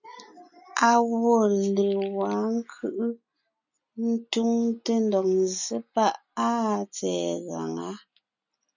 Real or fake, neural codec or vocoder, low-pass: real; none; 7.2 kHz